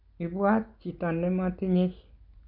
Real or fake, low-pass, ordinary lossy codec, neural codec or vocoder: real; 5.4 kHz; none; none